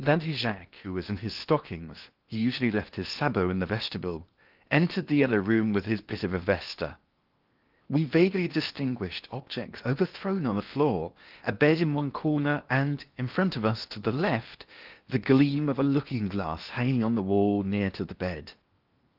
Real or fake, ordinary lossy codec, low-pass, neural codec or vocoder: fake; Opus, 32 kbps; 5.4 kHz; codec, 16 kHz in and 24 kHz out, 0.8 kbps, FocalCodec, streaming, 65536 codes